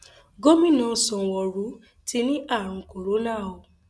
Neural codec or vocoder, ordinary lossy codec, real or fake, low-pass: none; none; real; none